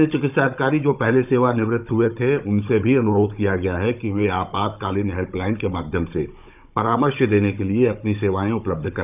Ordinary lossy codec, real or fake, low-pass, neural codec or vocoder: none; fake; 3.6 kHz; codec, 16 kHz, 16 kbps, FunCodec, trained on Chinese and English, 50 frames a second